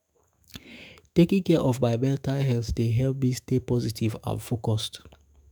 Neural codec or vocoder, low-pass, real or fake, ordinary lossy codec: autoencoder, 48 kHz, 128 numbers a frame, DAC-VAE, trained on Japanese speech; none; fake; none